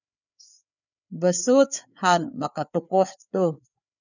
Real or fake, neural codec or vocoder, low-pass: fake; codec, 16 kHz, 4 kbps, FreqCodec, larger model; 7.2 kHz